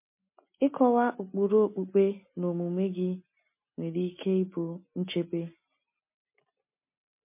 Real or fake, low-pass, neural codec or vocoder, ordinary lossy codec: real; 3.6 kHz; none; MP3, 24 kbps